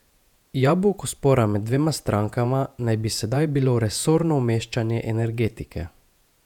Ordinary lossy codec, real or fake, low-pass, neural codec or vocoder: none; fake; 19.8 kHz; vocoder, 48 kHz, 128 mel bands, Vocos